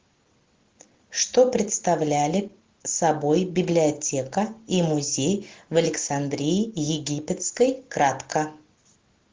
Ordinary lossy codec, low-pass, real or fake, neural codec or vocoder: Opus, 16 kbps; 7.2 kHz; real; none